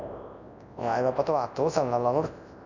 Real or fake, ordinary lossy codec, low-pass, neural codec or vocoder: fake; none; 7.2 kHz; codec, 24 kHz, 0.9 kbps, WavTokenizer, large speech release